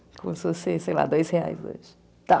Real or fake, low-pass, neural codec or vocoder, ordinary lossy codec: real; none; none; none